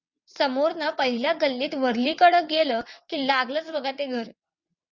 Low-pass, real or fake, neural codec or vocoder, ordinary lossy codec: 7.2 kHz; fake; vocoder, 22.05 kHz, 80 mel bands, WaveNeXt; Opus, 64 kbps